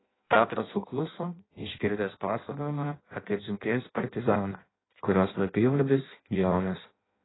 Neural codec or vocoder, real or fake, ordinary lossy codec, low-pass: codec, 16 kHz in and 24 kHz out, 0.6 kbps, FireRedTTS-2 codec; fake; AAC, 16 kbps; 7.2 kHz